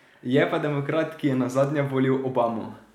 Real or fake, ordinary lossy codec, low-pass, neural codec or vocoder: real; none; 19.8 kHz; none